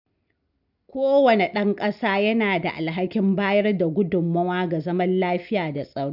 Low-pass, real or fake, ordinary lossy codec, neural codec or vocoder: 5.4 kHz; real; none; none